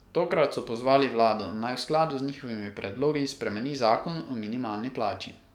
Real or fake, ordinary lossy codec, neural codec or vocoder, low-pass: fake; none; codec, 44.1 kHz, 7.8 kbps, DAC; 19.8 kHz